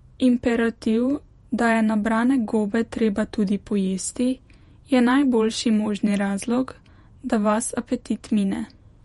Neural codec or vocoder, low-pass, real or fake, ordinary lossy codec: vocoder, 48 kHz, 128 mel bands, Vocos; 19.8 kHz; fake; MP3, 48 kbps